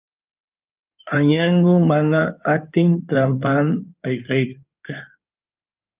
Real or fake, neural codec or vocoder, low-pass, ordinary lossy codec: fake; codec, 16 kHz in and 24 kHz out, 2.2 kbps, FireRedTTS-2 codec; 3.6 kHz; Opus, 24 kbps